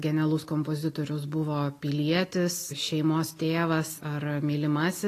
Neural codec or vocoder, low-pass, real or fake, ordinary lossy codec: none; 14.4 kHz; real; AAC, 48 kbps